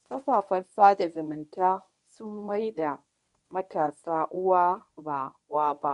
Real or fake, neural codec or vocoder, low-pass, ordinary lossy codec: fake; codec, 24 kHz, 0.9 kbps, WavTokenizer, medium speech release version 1; 10.8 kHz; none